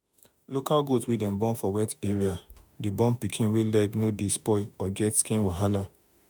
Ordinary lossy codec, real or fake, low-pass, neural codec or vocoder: none; fake; none; autoencoder, 48 kHz, 32 numbers a frame, DAC-VAE, trained on Japanese speech